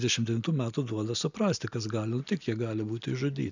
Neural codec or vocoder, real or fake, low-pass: none; real; 7.2 kHz